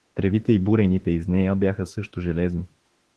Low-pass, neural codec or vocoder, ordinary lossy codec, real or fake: 10.8 kHz; autoencoder, 48 kHz, 32 numbers a frame, DAC-VAE, trained on Japanese speech; Opus, 16 kbps; fake